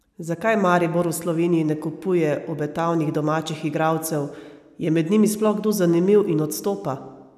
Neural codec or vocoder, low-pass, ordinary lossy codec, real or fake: none; 14.4 kHz; none; real